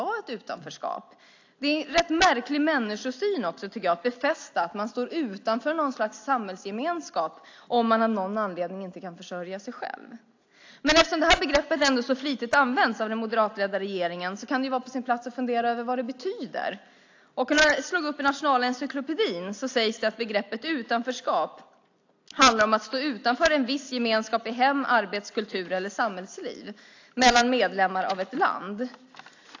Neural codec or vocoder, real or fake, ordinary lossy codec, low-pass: none; real; AAC, 48 kbps; 7.2 kHz